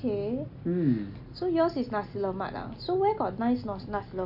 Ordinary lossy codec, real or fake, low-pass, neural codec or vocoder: AAC, 48 kbps; real; 5.4 kHz; none